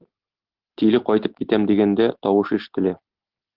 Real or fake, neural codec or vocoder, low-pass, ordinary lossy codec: real; none; 5.4 kHz; Opus, 16 kbps